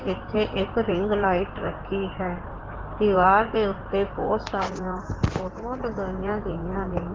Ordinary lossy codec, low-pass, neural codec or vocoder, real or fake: Opus, 16 kbps; 7.2 kHz; codec, 16 kHz in and 24 kHz out, 2.2 kbps, FireRedTTS-2 codec; fake